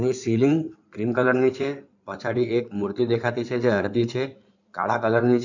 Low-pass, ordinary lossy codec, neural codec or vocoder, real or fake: 7.2 kHz; none; codec, 16 kHz in and 24 kHz out, 2.2 kbps, FireRedTTS-2 codec; fake